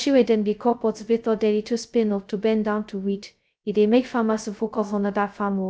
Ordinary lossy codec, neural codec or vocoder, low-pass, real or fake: none; codec, 16 kHz, 0.2 kbps, FocalCodec; none; fake